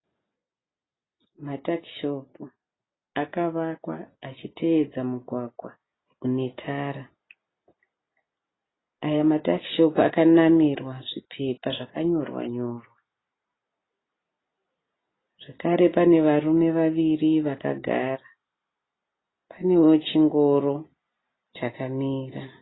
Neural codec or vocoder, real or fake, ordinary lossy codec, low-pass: none; real; AAC, 16 kbps; 7.2 kHz